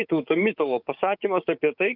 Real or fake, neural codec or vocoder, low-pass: fake; codec, 16 kHz, 6 kbps, DAC; 5.4 kHz